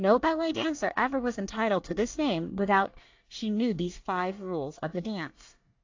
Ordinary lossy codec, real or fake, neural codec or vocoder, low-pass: AAC, 48 kbps; fake; codec, 24 kHz, 1 kbps, SNAC; 7.2 kHz